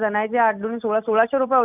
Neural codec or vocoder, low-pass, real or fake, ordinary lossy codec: none; 3.6 kHz; real; none